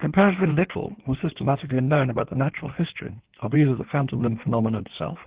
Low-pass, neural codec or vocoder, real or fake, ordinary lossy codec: 3.6 kHz; codec, 16 kHz in and 24 kHz out, 1.1 kbps, FireRedTTS-2 codec; fake; Opus, 16 kbps